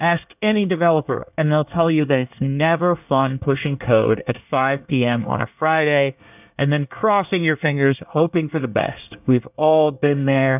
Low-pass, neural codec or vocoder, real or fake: 3.6 kHz; codec, 24 kHz, 1 kbps, SNAC; fake